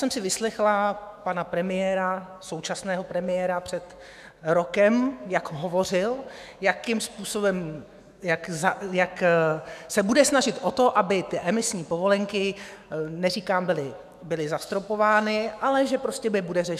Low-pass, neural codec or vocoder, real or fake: 14.4 kHz; autoencoder, 48 kHz, 128 numbers a frame, DAC-VAE, trained on Japanese speech; fake